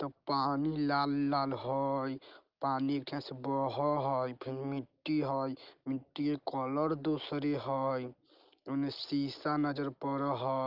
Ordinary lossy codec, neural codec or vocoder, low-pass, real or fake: Opus, 24 kbps; none; 5.4 kHz; real